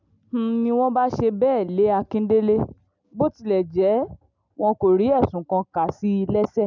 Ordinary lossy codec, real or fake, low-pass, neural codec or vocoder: none; real; 7.2 kHz; none